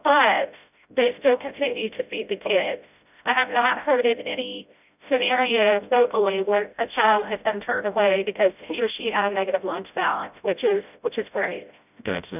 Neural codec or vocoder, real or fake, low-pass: codec, 16 kHz, 0.5 kbps, FreqCodec, smaller model; fake; 3.6 kHz